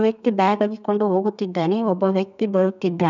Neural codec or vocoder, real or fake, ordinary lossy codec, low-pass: codec, 32 kHz, 1.9 kbps, SNAC; fake; none; 7.2 kHz